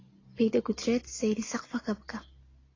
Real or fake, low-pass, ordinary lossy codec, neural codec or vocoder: real; 7.2 kHz; AAC, 32 kbps; none